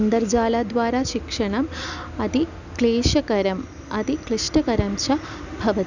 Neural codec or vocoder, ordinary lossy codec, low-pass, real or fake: none; none; 7.2 kHz; real